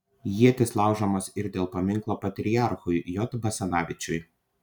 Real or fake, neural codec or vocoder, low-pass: real; none; 19.8 kHz